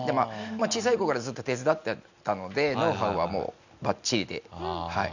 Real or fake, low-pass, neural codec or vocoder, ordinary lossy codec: real; 7.2 kHz; none; none